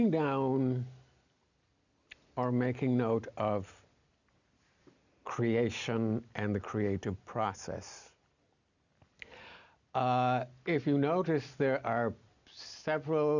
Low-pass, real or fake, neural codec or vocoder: 7.2 kHz; real; none